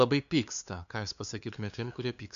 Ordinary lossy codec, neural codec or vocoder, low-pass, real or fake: MP3, 96 kbps; codec, 16 kHz, 2 kbps, FunCodec, trained on LibriTTS, 25 frames a second; 7.2 kHz; fake